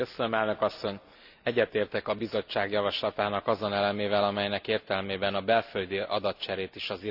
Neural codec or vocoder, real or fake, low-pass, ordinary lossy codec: none; real; 5.4 kHz; none